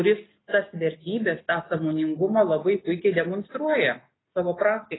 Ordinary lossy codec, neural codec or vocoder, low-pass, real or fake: AAC, 16 kbps; none; 7.2 kHz; real